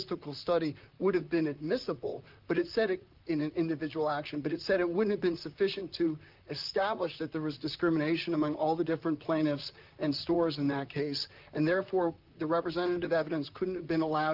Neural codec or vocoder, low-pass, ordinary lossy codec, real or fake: vocoder, 44.1 kHz, 128 mel bands, Pupu-Vocoder; 5.4 kHz; Opus, 24 kbps; fake